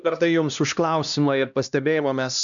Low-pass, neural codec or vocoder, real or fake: 7.2 kHz; codec, 16 kHz, 1 kbps, X-Codec, HuBERT features, trained on LibriSpeech; fake